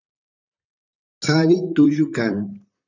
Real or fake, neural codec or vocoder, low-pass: fake; vocoder, 44.1 kHz, 128 mel bands, Pupu-Vocoder; 7.2 kHz